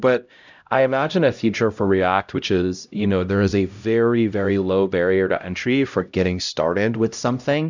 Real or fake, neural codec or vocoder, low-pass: fake; codec, 16 kHz, 0.5 kbps, X-Codec, HuBERT features, trained on LibriSpeech; 7.2 kHz